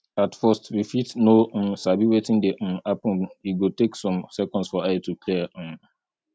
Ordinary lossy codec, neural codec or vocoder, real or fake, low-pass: none; none; real; none